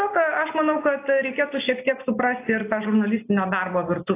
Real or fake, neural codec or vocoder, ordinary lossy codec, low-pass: real; none; AAC, 24 kbps; 3.6 kHz